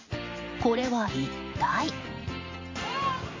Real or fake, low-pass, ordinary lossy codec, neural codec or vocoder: fake; 7.2 kHz; MP3, 48 kbps; vocoder, 44.1 kHz, 128 mel bands every 512 samples, BigVGAN v2